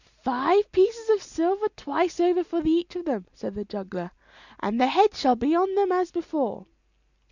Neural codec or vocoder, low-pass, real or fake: none; 7.2 kHz; real